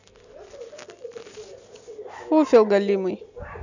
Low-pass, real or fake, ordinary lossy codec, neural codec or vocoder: 7.2 kHz; real; none; none